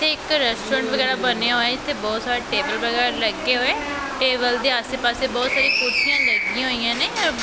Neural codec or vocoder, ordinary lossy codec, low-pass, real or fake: none; none; none; real